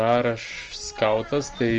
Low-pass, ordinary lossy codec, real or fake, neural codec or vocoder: 7.2 kHz; Opus, 24 kbps; real; none